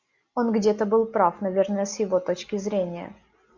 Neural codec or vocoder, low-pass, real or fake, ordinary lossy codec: none; 7.2 kHz; real; Opus, 64 kbps